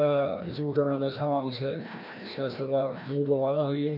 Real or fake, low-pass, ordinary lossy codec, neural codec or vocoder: fake; 5.4 kHz; none; codec, 16 kHz, 1 kbps, FreqCodec, larger model